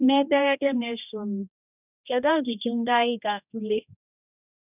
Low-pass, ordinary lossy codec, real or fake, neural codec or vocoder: 3.6 kHz; none; fake; codec, 16 kHz, 1 kbps, X-Codec, HuBERT features, trained on general audio